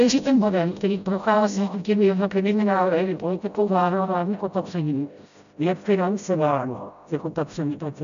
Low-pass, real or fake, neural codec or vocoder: 7.2 kHz; fake; codec, 16 kHz, 0.5 kbps, FreqCodec, smaller model